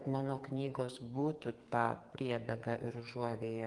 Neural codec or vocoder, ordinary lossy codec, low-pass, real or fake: codec, 44.1 kHz, 2.6 kbps, SNAC; Opus, 24 kbps; 10.8 kHz; fake